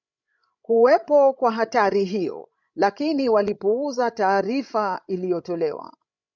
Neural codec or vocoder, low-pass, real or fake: codec, 16 kHz, 16 kbps, FreqCodec, larger model; 7.2 kHz; fake